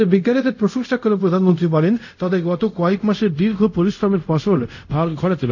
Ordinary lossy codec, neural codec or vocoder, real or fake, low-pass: none; codec, 24 kHz, 0.5 kbps, DualCodec; fake; 7.2 kHz